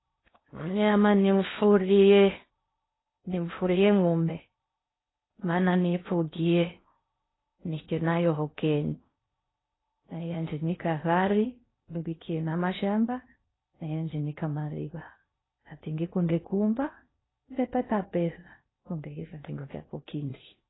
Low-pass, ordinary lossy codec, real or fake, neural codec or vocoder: 7.2 kHz; AAC, 16 kbps; fake; codec, 16 kHz in and 24 kHz out, 0.6 kbps, FocalCodec, streaming, 4096 codes